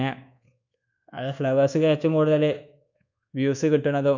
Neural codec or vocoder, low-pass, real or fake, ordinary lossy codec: codec, 24 kHz, 1.2 kbps, DualCodec; 7.2 kHz; fake; none